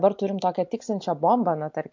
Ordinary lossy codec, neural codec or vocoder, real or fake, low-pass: MP3, 48 kbps; none; real; 7.2 kHz